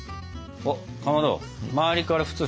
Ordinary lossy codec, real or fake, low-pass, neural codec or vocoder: none; real; none; none